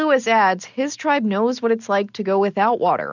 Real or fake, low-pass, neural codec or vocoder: real; 7.2 kHz; none